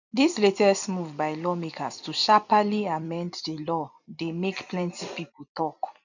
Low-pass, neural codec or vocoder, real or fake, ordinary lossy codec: 7.2 kHz; vocoder, 44.1 kHz, 128 mel bands every 256 samples, BigVGAN v2; fake; none